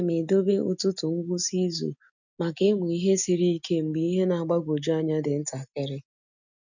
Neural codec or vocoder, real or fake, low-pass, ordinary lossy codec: none; real; 7.2 kHz; none